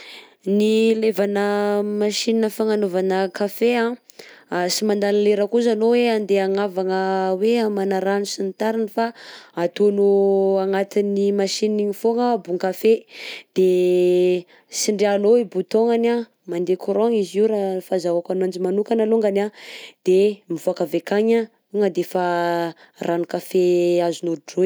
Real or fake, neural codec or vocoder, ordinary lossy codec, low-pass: real; none; none; none